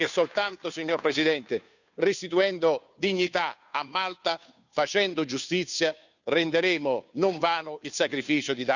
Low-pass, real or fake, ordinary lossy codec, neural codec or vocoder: 7.2 kHz; fake; none; codec, 16 kHz, 2 kbps, FunCodec, trained on Chinese and English, 25 frames a second